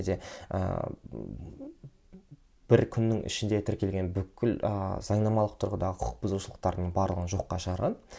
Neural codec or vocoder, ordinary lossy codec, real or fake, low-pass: none; none; real; none